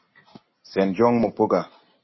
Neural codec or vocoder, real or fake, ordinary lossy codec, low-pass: none; real; MP3, 24 kbps; 7.2 kHz